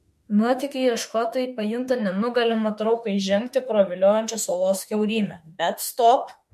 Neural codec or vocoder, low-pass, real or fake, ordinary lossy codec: autoencoder, 48 kHz, 32 numbers a frame, DAC-VAE, trained on Japanese speech; 14.4 kHz; fake; MP3, 64 kbps